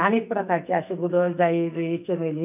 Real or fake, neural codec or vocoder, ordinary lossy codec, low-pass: fake; codec, 44.1 kHz, 2.6 kbps, SNAC; none; 3.6 kHz